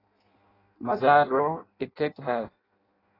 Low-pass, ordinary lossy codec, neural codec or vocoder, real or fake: 5.4 kHz; AAC, 24 kbps; codec, 16 kHz in and 24 kHz out, 0.6 kbps, FireRedTTS-2 codec; fake